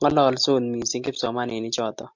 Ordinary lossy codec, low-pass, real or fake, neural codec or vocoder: MP3, 64 kbps; 7.2 kHz; real; none